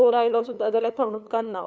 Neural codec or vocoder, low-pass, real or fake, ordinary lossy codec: codec, 16 kHz, 2 kbps, FunCodec, trained on LibriTTS, 25 frames a second; none; fake; none